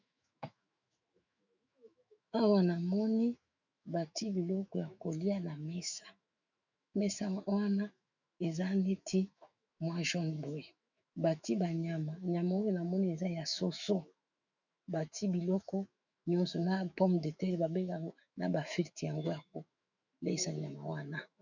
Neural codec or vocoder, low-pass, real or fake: autoencoder, 48 kHz, 128 numbers a frame, DAC-VAE, trained on Japanese speech; 7.2 kHz; fake